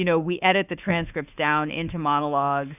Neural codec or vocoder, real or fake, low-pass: autoencoder, 48 kHz, 32 numbers a frame, DAC-VAE, trained on Japanese speech; fake; 3.6 kHz